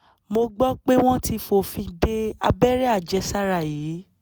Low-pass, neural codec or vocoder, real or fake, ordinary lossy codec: none; none; real; none